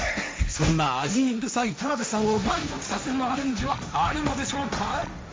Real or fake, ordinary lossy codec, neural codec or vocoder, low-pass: fake; none; codec, 16 kHz, 1.1 kbps, Voila-Tokenizer; none